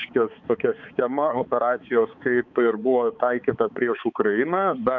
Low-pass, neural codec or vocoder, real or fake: 7.2 kHz; codec, 16 kHz, 4 kbps, X-Codec, HuBERT features, trained on balanced general audio; fake